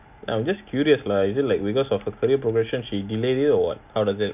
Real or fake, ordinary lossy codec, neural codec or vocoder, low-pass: real; none; none; 3.6 kHz